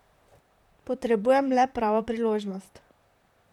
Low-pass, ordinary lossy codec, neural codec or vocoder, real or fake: 19.8 kHz; none; vocoder, 44.1 kHz, 128 mel bands, Pupu-Vocoder; fake